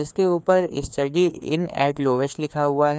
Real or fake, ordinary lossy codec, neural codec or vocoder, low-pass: fake; none; codec, 16 kHz, 2 kbps, FreqCodec, larger model; none